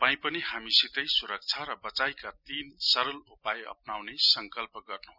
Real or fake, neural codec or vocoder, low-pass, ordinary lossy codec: real; none; 5.4 kHz; none